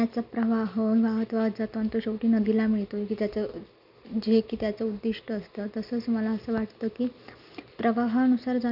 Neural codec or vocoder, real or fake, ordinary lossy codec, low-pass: none; real; none; 5.4 kHz